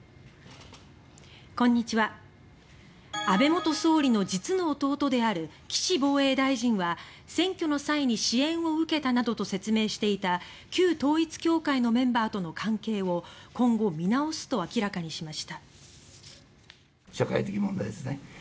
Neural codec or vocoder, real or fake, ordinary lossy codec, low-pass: none; real; none; none